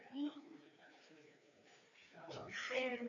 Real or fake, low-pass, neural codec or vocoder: fake; 7.2 kHz; codec, 16 kHz, 2 kbps, FreqCodec, larger model